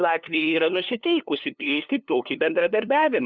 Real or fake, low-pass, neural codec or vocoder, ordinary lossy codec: fake; 7.2 kHz; codec, 16 kHz, 2 kbps, FunCodec, trained on LibriTTS, 25 frames a second; Opus, 64 kbps